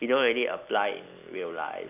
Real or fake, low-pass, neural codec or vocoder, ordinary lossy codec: fake; 3.6 kHz; vocoder, 44.1 kHz, 128 mel bands every 256 samples, BigVGAN v2; none